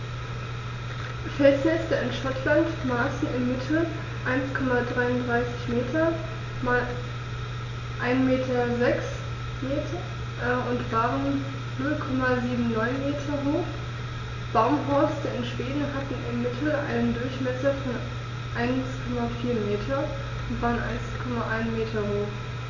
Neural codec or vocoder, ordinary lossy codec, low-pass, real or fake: none; none; 7.2 kHz; real